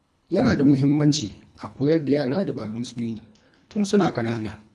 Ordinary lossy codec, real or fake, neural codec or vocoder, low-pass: none; fake; codec, 24 kHz, 1.5 kbps, HILCodec; none